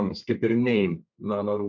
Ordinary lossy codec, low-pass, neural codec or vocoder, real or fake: MP3, 48 kbps; 7.2 kHz; codec, 32 kHz, 1.9 kbps, SNAC; fake